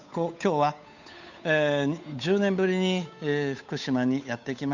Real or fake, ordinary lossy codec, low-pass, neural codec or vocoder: fake; none; 7.2 kHz; codec, 16 kHz, 8 kbps, FunCodec, trained on Chinese and English, 25 frames a second